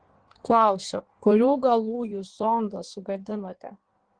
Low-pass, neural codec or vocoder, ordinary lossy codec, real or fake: 9.9 kHz; codec, 16 kHz in and 24 kHz out, 1.1 kbps, FireRedTTS-2 codec; Opus, 16 kbps; fake